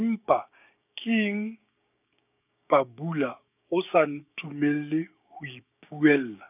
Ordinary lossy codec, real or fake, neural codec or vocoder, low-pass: none; fake; codec, 16 kHz, 6 kbps, DAC; 3.6 kHz